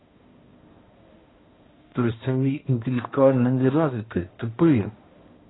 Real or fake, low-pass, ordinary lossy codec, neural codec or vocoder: fake; 7.2 kHz; AAC, 16 kbps; codec, 16 kHz, 1 kbps, X-Codec, HuBERT features, trained on balanced general audio